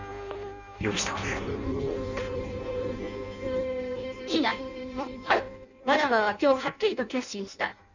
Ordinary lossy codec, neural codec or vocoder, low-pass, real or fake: none; codec, 16 kHz in and 24 kHz out, 0.6 kbps, FireRedTTS-2 codec; 7.2 kHz; fake